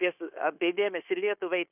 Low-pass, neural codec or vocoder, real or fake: 3.6 kHz; codec, 16 kHz, 0.9 kbps, LongCat-Audio-Codec; fake